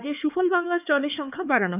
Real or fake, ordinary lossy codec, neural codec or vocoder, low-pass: fake; none; codec, 16 kHz, 4 kbps, X-Codec, HuBERT features, trained on LibriSpeech; 3.6 kHz